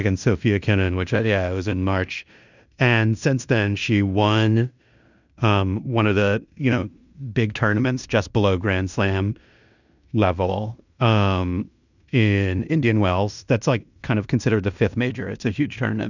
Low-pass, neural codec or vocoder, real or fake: 7.2 kHz; codec, 16 kHz in and 24 kHz out, 0.9 kbps, LongCat-Audio-Codec, fine tuned four codebook decoder; fake